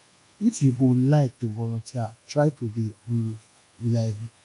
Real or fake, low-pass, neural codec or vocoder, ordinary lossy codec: fake; 10.8 kHz; codec, 24 kHz, 1.2 kbps, DualCodec; none